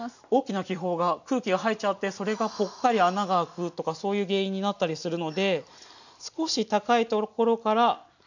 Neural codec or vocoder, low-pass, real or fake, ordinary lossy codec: none; 7.2 kHz; real; none